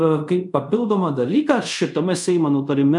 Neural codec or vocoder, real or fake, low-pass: codec, 24 kHz, 0.5 kbps, DualCodec; fake; 10.8 kHz